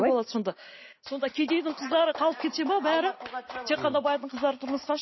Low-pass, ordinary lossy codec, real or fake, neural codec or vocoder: 7.2 kHz; MP3, 24 kbps; real; none